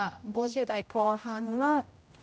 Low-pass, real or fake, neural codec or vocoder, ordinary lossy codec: none; fake; codec, 16 kHz, 0.5 kbps, X-Codec, HuBERT features, trained on general audio; none